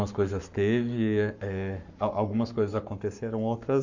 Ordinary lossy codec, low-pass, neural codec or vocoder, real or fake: Opus, 64 kbps; 7.2 kHz; codec, 44.1 kHz, 7.8 kbps, Pupu-Codec; fake